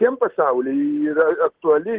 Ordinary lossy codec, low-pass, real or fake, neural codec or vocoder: Opus, 16 kbps; 3.6 kHz; real; none